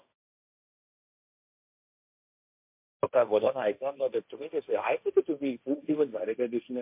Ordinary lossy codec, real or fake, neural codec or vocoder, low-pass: MP3, 32 kbps; fake; codec, 16 kHz, 1.1 kbps, Voila-Tokenizer; 3.6 kHz